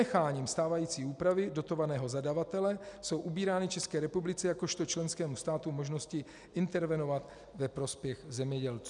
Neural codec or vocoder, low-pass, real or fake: none; 10.8 kHz; real